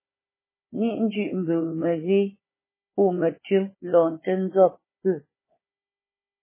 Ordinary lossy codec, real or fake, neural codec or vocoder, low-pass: MP3, 16 kbps; fake; codec, 16 kHz, 4 kbps, FunCodec, trained on Chinese and English, 50 frames a second; 3.6 kHz